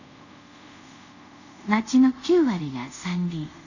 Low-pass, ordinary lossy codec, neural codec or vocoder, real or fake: 7.2 kHz; none; codec, 24 kHz, 0.5 kbps, DualCodec; fake